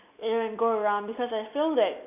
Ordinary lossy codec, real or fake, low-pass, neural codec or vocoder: none; real; 3.6 kHz; none